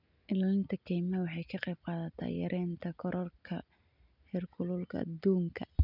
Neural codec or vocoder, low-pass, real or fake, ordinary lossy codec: none; 5.4 kHz; real; none